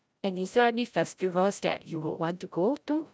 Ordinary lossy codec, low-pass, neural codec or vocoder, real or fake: none; none; codec, 16 kHz, 0.5 kbps, FreqCodec, larger model; fake